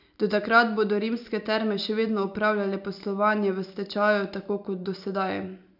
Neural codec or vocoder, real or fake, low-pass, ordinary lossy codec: none; real; 5.4 kHz; none